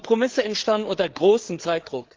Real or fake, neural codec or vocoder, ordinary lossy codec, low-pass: fake; codec, 16 kHz, 4 kbps, FreqCodec, larger model; Opus, 16 kbps; 7.2 kHz